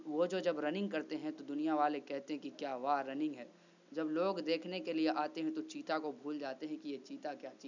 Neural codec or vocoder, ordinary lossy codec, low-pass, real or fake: none; none; 7.2 kHz; real